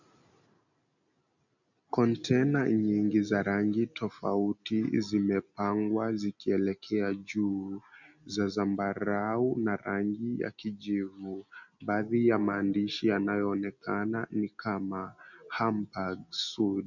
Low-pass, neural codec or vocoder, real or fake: 7.2 kHz; none; real